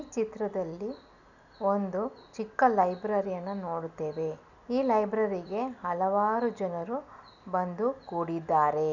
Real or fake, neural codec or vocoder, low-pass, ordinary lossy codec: real; none; 7.2 kHz; none